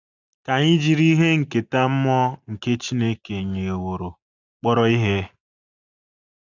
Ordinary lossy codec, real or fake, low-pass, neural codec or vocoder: none; real; 7.2 kHz; none